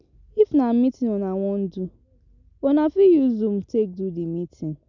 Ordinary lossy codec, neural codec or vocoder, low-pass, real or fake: none; none; 7.2 kHz; real